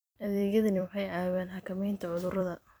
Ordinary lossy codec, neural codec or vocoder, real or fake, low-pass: none; vocoder, 44.1 kHz, 128 mel bands every 256 samples, BigVGAN v2; fake; none